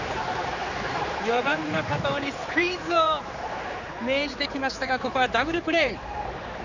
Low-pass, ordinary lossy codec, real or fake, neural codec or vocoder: 7.2 kHz; none; fake; codec, 16 kHz, 4 kbps, X-Codec, HuBERT features, trained on general audio